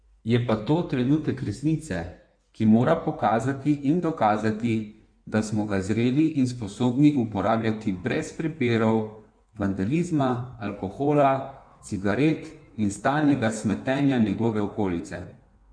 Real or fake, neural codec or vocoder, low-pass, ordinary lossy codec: fake; codec, 16 kHz in and 24 kHz out, 1.1 kbps, FireRedTTS-2 codec; 9.9 kHz; none